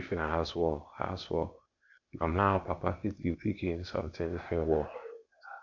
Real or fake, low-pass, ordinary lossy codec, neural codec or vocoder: fake; 7.2 kHz; none; codec, 16 kHz, 0.8 kbps, ZipCodec